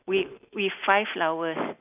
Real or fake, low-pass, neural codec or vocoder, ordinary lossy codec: real; 3.6 kHz; none; none